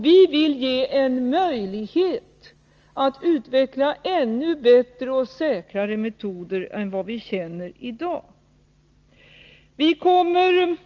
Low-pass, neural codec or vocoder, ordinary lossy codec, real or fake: 7.2 kHz; none; Opus, 24 kbps; real